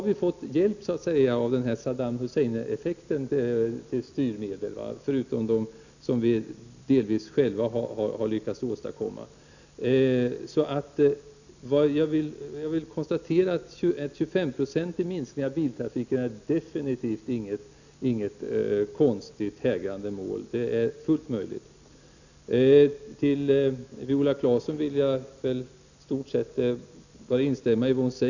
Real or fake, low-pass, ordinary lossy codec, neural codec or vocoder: real; 7.2 kHz; none; none